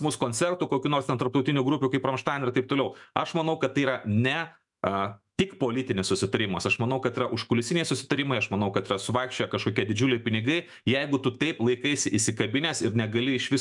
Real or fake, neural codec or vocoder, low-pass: fake; autoencoder, 48 kHz, 128 numbers a frame, DAC-VAE, trained on Japanese speech; 10.8 kHz